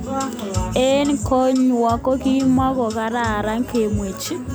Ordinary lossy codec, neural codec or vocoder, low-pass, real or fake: none; none; none; real